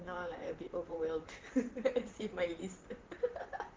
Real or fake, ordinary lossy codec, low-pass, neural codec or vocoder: fake; Opus, 16 kbps; 7.2 kHz; vocoder, 44.1 kHz, 128 mel bands, Pupu-Vocoder